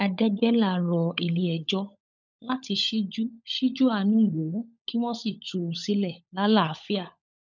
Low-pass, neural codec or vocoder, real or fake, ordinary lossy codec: 7.2 kHz; codec, 16 kHz, 16 kbps, FunCodec, trained on LibriTTS, 50 frames a second; fake; none